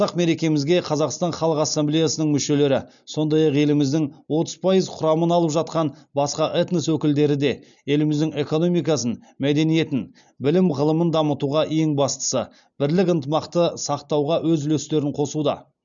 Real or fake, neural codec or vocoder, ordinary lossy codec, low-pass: real; none; none; 7.2 kHz